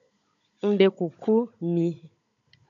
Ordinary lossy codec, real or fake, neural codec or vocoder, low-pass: MP3, 96 kbps; fake; codec, 16 kHz, 16 kbps, FunCodec, trained on Chinese and English, 50 frames a second; 7.2 kHz